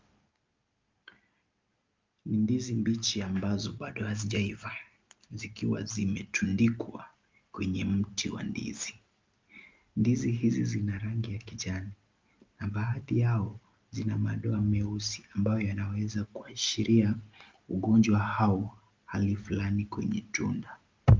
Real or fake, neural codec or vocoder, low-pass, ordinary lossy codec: real; none; 7.2 kHz; Opus, 24 kbps